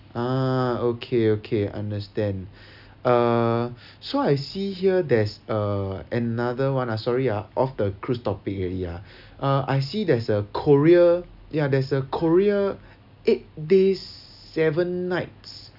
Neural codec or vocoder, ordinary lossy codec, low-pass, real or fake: none; none; 5.4 kHz; real